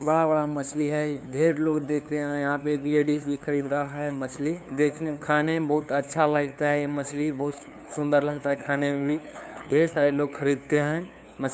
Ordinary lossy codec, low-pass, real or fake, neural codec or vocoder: none; none; fake; codec, 16 kHz, 2 kbps, FunCodec, trained on LibriTTS, 25 frames a second